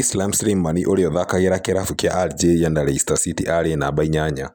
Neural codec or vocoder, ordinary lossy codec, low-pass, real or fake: none; none; none; real